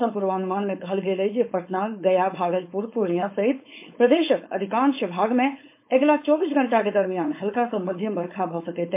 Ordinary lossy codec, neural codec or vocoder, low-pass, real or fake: MP3, 24 kbps; codec, 16 kHz, 4.8 kbps, FACodec; 3.6 kHz; fake